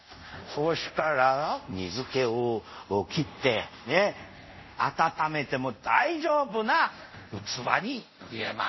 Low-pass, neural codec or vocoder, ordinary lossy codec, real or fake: 7.2 kHz; codec, 24 kHz, 0.5 kbps, DualCodec; MP3, 24 kbps; fake